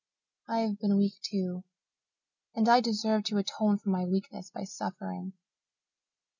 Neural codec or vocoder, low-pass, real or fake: none; 7.2 kHz; real